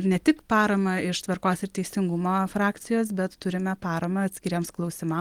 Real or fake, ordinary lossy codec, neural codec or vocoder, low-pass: real; Opus, 24 kbps; none; 19.8 kHz